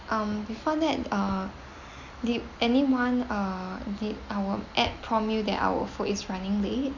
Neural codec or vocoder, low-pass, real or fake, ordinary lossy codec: none; 7.2 kHz; real; none